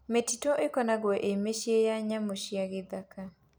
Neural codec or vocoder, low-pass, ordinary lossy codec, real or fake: none; none; none; real